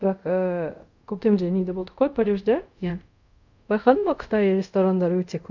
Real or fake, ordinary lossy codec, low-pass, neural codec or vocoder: fake; none; 7.2 kHz; codec, 24 kHz, 0.5 kbps, DualCodec